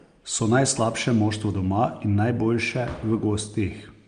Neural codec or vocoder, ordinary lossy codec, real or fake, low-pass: none; Opus, 32 kbps; real; 9.9 kHz